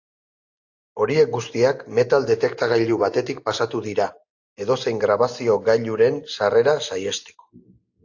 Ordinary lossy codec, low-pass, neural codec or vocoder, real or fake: AAC, 48 kbps; 7.2 kHz; none; real